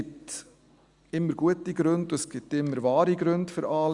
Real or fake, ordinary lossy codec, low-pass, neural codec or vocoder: real; Opus, 64 kbps; 10.8 kHz; none